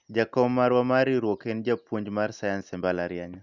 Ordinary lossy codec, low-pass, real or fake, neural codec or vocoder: none; 7.2 kHz; real; none